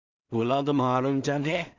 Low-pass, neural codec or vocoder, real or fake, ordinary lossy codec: 7.2 kHz; codec, 16 kHz in and 24 kHz out, 0.4 kbps, LongCat-Audio-Codec, two codebook decoder; fake; Opus, 64 kbps